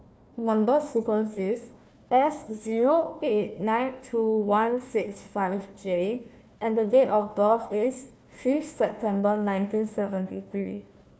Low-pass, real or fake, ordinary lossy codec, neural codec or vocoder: none; fake; none; codec, 16 kHz, 1 kbps, FunCodec, trained on Chinese and English, 50 frames a second